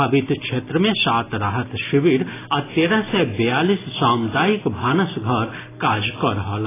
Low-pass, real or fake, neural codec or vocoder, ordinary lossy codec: 3.6 kHz; real; none; AAC, 16 kbps